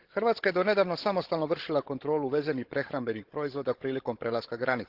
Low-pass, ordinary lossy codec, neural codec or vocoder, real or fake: 5.4 kHz; Opus, 16 kbps; codec, 16 kHz, 16 kbps, FunCodec, trained on Chinese and English, 50 frames a second; fake